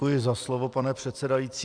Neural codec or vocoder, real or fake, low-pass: none; real; 9.9 kHz